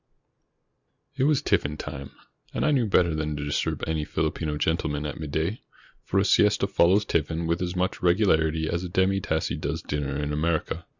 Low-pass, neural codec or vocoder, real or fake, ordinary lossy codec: 7.2 kHz; none; real; Opus, 64 kbps